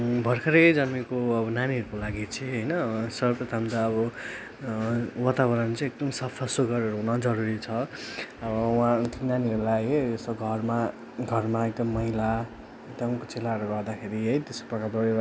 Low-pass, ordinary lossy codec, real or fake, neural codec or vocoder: none; none; real; none